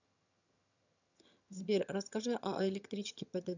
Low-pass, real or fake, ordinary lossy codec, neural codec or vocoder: 7.2 kHz; fake; none; vocoder, 22.05 kHz, 80 mel bands, HiFi-GAN